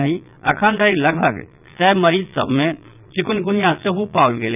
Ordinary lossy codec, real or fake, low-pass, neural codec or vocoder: none; fake; 3.6 kHz; vocoder, 22.05 kHz, 80 mel bands, Vocos